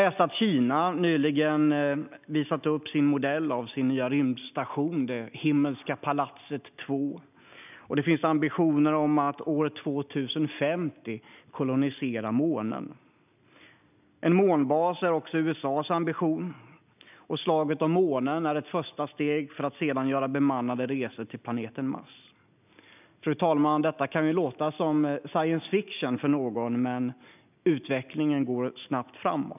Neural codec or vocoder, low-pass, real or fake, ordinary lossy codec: none; 3.6 kHz; real; none